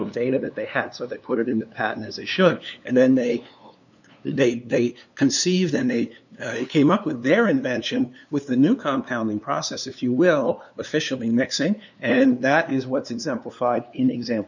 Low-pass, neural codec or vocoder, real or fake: 7.2 kHz; codec, 16 kHz, 2 kbps, FunCodec, trained on LibriTTS, 25 frames a second; fake